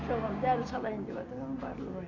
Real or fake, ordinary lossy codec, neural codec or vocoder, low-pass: real; none; none; 7.2 kHz